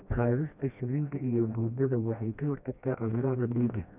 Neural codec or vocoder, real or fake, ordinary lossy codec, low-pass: codec, 16 kHz, 1 kbps, FreqCodec, smaller model; fake; none; 3.6 kHz